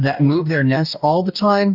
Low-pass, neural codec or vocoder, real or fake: 5.4 kHz; codec, 44.1 kHz, 2.6 kbps, DAC; fake